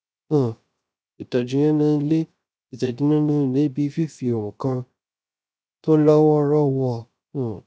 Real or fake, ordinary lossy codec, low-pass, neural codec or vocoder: fake; none; none; codec, 16 kHz, 0.3 kbps, FocalCodec